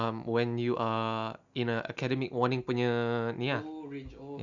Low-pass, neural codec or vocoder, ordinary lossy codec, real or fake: 7.2 kHz; none; Opus, 64 kbps; real